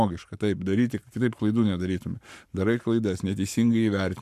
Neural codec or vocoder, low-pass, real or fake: codec, 44.1 kHz, 7.8 kbps, Pupu-Codec; 14.4 kHz; fake